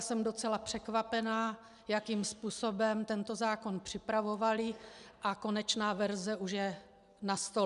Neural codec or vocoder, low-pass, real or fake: none; 10.8 kHz; real